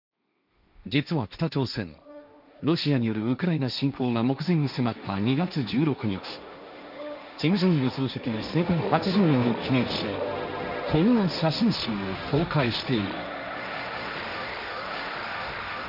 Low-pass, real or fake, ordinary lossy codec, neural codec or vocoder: 5.4 kHz; fake; none; codec, 16 kHz, 1.1 kbps, Voila-Tokenizer